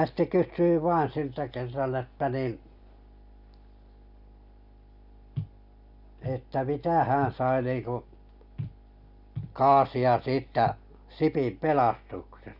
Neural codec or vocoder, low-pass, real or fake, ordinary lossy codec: none; 5.4 kHz; real; none